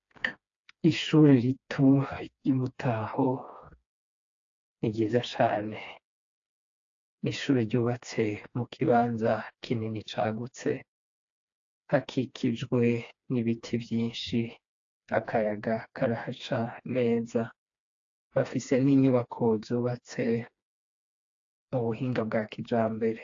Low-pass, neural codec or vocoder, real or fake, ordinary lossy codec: 7.2 kHz; codec, 16 kHz, 2 kbps, FreqCodec, smaller model; fake; AAC, 48 kbps